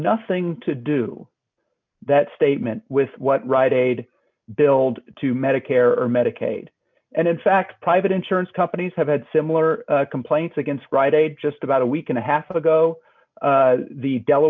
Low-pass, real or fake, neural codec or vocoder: 7.2 kHz; real; none